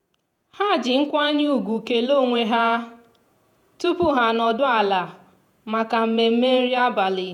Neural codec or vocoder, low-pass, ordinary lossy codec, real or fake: vocoder, 48 kHz, 128 mel bands, Vocos; 19.8 kHz; none; fake